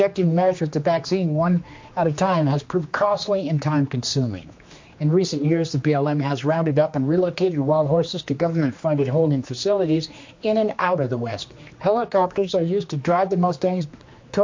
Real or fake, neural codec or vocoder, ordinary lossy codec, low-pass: fake; codec, 16 kHz, 2 kbps, X-Codec, HuBERT features, trained on general audio; MP3, 48 kbps; 7.2 kHz